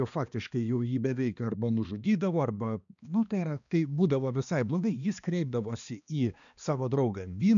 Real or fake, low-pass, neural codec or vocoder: fake; 7.2 kHz; codec, 16 kHz, 2 kbps, X-Codec, HuBERT features, trained on balanced general audio